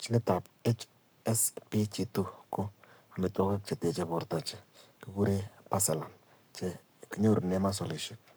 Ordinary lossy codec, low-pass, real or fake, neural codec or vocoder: none; none; fake; codec, 44.1 kHz, 7.8 kbps, Pupu-Codec